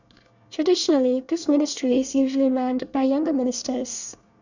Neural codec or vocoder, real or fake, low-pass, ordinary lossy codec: codec, 24 kHz, 1 kbps, SNAC; fake; 7.2 kHz; none